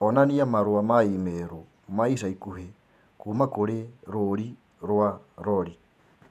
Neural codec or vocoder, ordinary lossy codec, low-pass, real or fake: vocoder, 48 kHz, 128 mel bands, Vocos; none; 14.4 kHz; fake